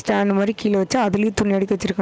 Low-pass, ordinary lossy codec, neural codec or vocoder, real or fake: none; none; none; real